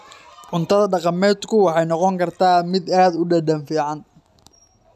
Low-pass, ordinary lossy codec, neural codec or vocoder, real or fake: 14.4 kHz; none; none; real